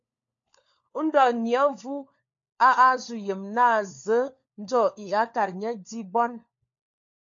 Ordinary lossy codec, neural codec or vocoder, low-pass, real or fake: AAC, 64 kbps; codec, 16 kHz, 4 kbps, FunCodec, trained on LibriTTS, 50 frames a second; 7.2 kHz; fake